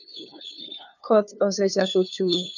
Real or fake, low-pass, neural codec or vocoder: fake; 7.2 kHz; codec, 16 kHz, 2 kbps, FunCodec, trained on LibriTTS, 25 frames a second